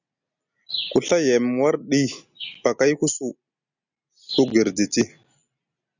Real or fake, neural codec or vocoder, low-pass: real; none; 7.2 kHz